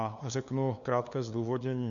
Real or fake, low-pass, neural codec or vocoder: fake; 7.2 kHz; codec, 16 kHz, 2 kbps, FunCodec, trained on LibriTTS, 25 frames a second